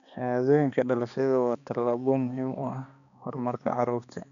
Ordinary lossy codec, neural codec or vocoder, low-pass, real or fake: none; codec, 16 kHz, 2 kbps, X-Codec, HuBERT features, trained on balanced general audio; 7.2 kHz; fake